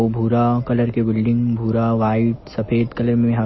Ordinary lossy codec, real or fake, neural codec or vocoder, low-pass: MP3, 24 kbps; real; none; 7.2 kHz